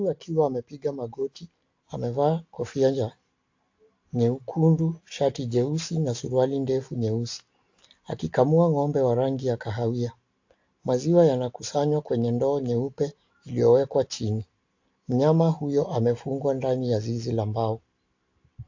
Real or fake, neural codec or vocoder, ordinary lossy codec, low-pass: real; none; AAC, 48 kbps; 7.2 kHz